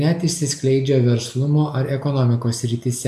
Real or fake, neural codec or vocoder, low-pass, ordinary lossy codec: real; none; 14.4 kHz; AAC, 96 kbps